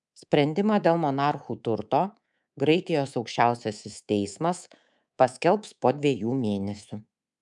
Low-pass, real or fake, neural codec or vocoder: 10.8 kHz; fake; codec, 24 kHz, 3.1 kbps, DualCodec